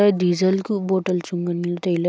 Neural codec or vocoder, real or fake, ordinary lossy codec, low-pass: none; real; none; none